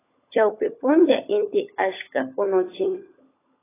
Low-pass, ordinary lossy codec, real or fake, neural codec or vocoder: 3.6 kHz; AAC, 16 kbps; fake; codec, 16 kHz, 16 kbps, FunCodec, trained on LibriTTS, 50 frames a second